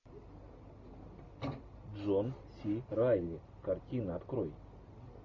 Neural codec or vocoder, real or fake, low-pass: none; real; 7.2 kHz